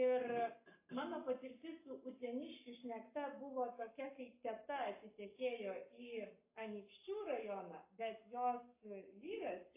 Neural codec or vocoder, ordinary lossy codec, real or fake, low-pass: codec, 44.1 kHz, 7.8 kbps, Pupu-Codec; AAC, 24 kbps; fake; 3.6 kHz